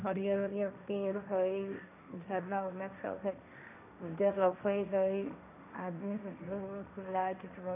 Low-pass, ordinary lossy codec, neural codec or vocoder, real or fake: 3.6 kHz; none; codec, 16 kHz, 1.1 kbps, Voila-Tokenizer; fake